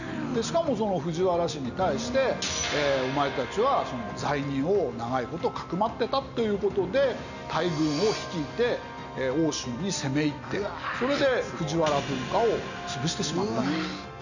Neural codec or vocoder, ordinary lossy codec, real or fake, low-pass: none; none; real; 7.2 kHz